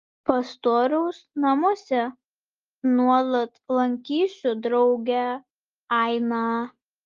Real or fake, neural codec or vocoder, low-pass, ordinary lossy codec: real; none; 7.2 kHz; Opus, 32 kbps